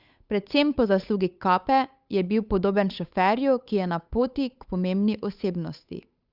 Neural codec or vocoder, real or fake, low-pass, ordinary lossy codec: codec, 16 kHz, 8 kbps, FunCodec, trained on Chinese and English, 25 frames a second; fake; 5.4 kHz; Opus, 64 kbps